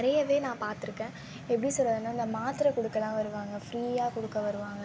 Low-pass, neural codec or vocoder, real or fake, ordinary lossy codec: none; none; real; none